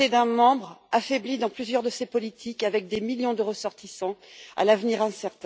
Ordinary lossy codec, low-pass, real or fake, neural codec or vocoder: none; none; real; none